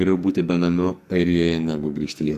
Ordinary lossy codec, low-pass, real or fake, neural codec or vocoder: Opus, 64 kbps; 14.4 kHz; fake; codec, 44.1 kHz, 2.6 kbps, SNAC